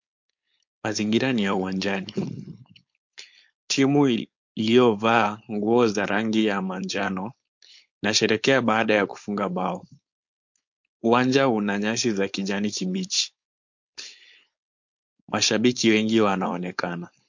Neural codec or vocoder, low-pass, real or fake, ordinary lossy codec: codec, 16 kHz, 4.8 kbps, FACodec; 7.2 kHz; fake; MP3, 48 kbps